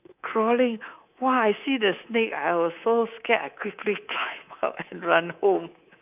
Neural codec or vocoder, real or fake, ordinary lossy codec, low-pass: codec, 24 kHz, 3.1 kbps, DualCodec; fake; none; 3.6 kHz